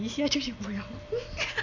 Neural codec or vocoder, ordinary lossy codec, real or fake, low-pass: none; none; real; 7.2 kHz